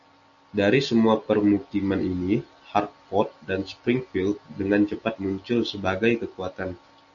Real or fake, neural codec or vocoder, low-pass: real; none; 7.2 kHz